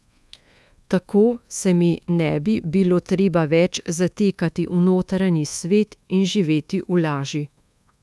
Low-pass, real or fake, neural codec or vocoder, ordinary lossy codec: none; fake; codec, 24 kHz, 1.2 kbps, DualCodec; none